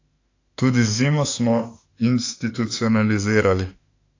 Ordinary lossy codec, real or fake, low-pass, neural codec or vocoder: AAC, 48 kbps; fake; 7.2 kHz; autoencoder, 48 kHz, 32 numbers a frame, DAC-VAE, trained on Japanese speech